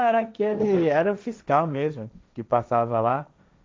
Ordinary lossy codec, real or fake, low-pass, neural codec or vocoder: none; fake; none; codec, 16 kHz, 1.1 kbps, Voila-Tokenizer